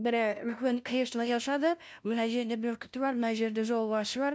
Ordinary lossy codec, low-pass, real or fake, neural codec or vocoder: none; none; fake; codec, 16 kHz, 0.5 kbps, FunCodec, trained on LibriTTS, 25 frames a second